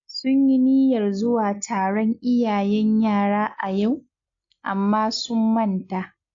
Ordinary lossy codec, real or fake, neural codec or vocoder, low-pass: AAC, 48 kbps; real; none; 7.2 kHz